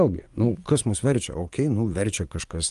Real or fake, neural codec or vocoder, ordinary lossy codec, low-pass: fake; vocoder, 24 kHz, 100 mel bands, Vocos; Opus, 32 kbps; 10.8 kHz